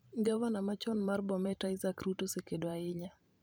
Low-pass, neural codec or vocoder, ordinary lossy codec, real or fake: none; vocoder, 44.1 kHz, 128 mel bands every 512 samples, BigVGAN v2; none; fake